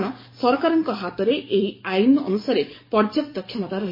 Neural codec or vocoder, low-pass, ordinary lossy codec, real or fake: codec, 44.1 kHz, 7.8 kbps, DAC; 5.4 kHz; MP3, 24 kbps; fake